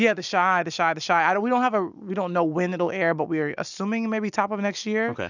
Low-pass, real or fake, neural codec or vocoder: 7.2 kHz; real; none